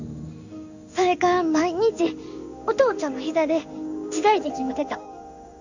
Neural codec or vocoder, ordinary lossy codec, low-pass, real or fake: codec, 16 kHz in and 24 kHz out, 1 kbps, XY-Tokenizer; none; 7.2 kHz; fake